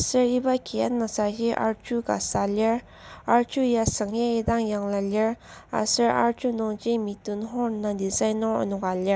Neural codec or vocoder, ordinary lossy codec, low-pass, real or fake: none; none; none; real